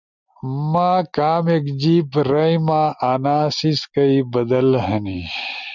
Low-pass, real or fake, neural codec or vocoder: 7.2 kHz; real; none